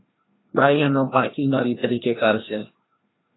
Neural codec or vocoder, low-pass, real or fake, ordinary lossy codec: codec, 16 kHz, 1 kbps, FreqCodec, larger model; 7.2 kHz; fake; AAC, 16 kbps